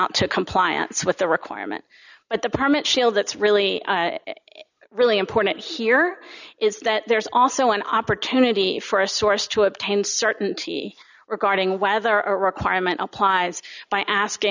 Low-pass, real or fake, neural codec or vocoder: 7.2 kHz; real; none